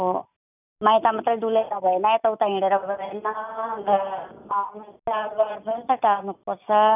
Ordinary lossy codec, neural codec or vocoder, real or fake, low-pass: none; none; real; 3.6 kHz